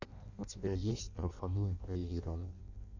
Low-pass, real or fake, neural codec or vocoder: 7.2 kHz; fake; codec, 16 kHz in and 24 kHz out, 0.6 kbps, FireRedTTS-2 codec